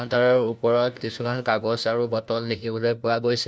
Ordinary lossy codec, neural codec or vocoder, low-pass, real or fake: none; codec, 16 kHz, 1 kbps, FunCodec, trained on LibriTTS, 50 frames a second; none; fake